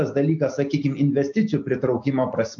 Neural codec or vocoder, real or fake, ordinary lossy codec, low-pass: none; real; AAC, 64 kbps; 7.2 kHz